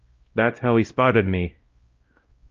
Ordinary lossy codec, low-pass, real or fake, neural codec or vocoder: Opus, 24 kbps; 7.2 kHz; fake; codec, 16 kHz, 0.5 kbps, X-Codec, WavLM features, trained on Multilingual LibriSpeech